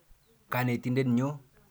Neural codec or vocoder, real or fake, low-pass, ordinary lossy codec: none; real; none; none